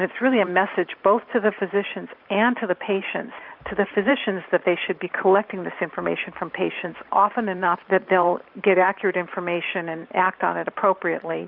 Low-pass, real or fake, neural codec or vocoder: 5.4 kHz; fake; vocoder, 44.1 kHz, 128 mel bands every 512 samples, BigVGAN v2